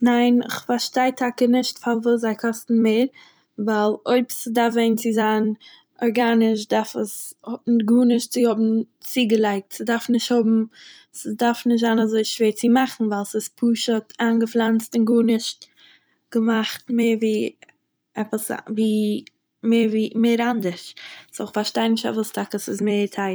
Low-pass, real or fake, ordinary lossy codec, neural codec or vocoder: none; fake; none; vocoder, 44.1 kHz, 128 mel bands every 256 samples, BigVGAN v2